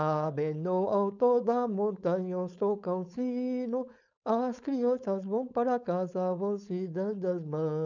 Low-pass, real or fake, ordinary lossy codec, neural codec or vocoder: 7.2 kHz; fake; none; codec, 16 kHz, 4.8 kbps, FACodec